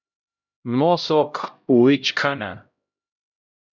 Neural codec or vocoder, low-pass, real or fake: codec, 16 kHz, 0.5 kbps, X-Codec, HuBERT features, trained on LibriSpeech; 7.2 kHz; fake